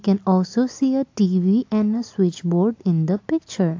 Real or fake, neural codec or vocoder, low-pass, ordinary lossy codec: real; none; 7.2 kHz; AAC, 48 kbps